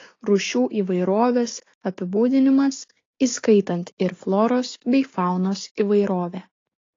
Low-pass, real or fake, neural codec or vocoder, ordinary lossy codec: 7.2 kHz; fake; codec, 16 kHz, 6 kbps, DAC; AAC, 32 kbps